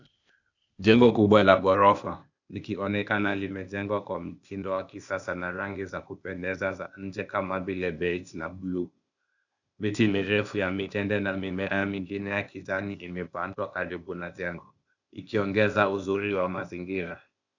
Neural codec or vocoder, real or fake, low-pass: codec, 16 kHz, 0.8 kbps, ZipCodec; fake; 7.2 kHz